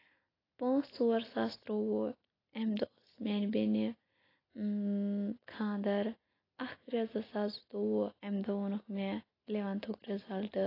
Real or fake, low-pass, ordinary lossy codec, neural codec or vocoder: real; 5.4 kHz; AAC, 24 kbps; none